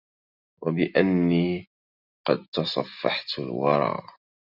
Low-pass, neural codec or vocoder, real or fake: 5.4 kHz; none; real